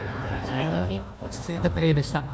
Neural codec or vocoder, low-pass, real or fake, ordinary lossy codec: codec, 16 kHz, 1 kbps, FunCodec, trained on Chinese and English, 50 frames a second; none; fake; none